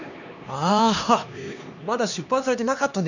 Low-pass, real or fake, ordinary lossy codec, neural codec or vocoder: 7.2 kHz; fake; none; codec, 16 kHz, 2 kbps, X-Codec, HuBERT features, trained on LibriSpeech